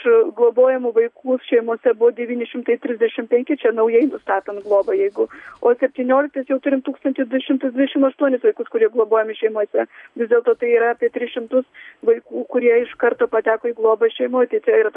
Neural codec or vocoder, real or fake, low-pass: none; real; 10.8 kHz